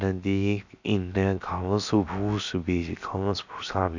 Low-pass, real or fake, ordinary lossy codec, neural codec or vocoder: 7.2 kHz; fake; none; codec, 16 kHz, 0.7 kbps, FocalCodec